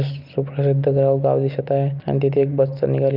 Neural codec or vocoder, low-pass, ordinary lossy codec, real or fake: none; 5.4 kHz; Opus, 24 kbps; real